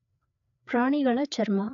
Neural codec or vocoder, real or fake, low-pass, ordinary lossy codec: codec, 16 kHz, 4 kbps, FreqCodec, larger model; fake; 7.2 kHz; AAC, 96 kbps